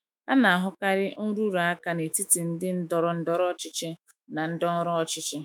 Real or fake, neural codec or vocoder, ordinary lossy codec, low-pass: fake; autoencoder, 48 kHz, 128 numbers a frame, DAC-VAE, trained on Japanese speech; none; none